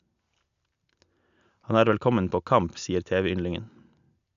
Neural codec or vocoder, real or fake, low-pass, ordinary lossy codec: none; real; 7.2 kHz; none